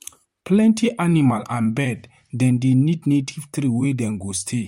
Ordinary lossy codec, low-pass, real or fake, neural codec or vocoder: MP3, 64 kbps; 19.8 kHz; fake; vocoder, 44.1 kHz, 128 mel bands, Pupu-Vocoder